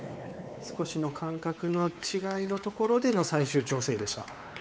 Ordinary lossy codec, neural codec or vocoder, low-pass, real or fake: none; codec, 16 kHz, 4 kbps, X-Codec, WavLM features, trained on Multilingual LibriSpeech; none; fake